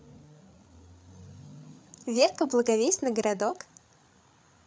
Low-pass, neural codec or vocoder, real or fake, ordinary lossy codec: none; codec, 16 kHz, 8 kbps, FreqCodec, larger model; fake; none